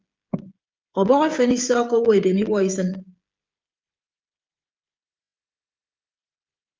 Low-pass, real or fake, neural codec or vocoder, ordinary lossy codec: 7.2 kHz; fake; codec, 16 kHz, 16 kbps, FreqCodec, smaller model; Opus, 32 kbps